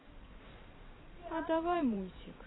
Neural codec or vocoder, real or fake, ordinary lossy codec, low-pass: vocoder, 44.1 kHz, 128 mel bands every 512 samples, BigVGAN v2; fake; AAC, 16 kbps; 7.2 kHz